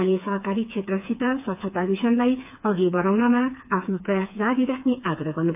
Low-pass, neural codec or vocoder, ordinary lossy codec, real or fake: 3.6 kHz; codec, 16 kHz, 4 kbps, FreqCodec, smaller model; AAC, 32 kbps; fake